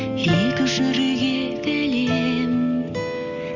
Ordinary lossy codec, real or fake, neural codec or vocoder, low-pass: none; real; none; 7.2 kHz